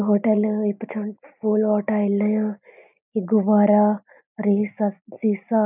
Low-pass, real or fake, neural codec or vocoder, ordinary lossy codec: 3.6 kHz; real; none; none